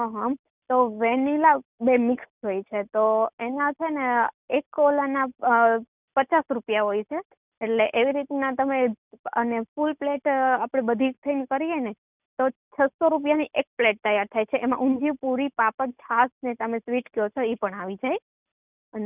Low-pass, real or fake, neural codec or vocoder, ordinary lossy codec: 3.6 kHz; real; none; none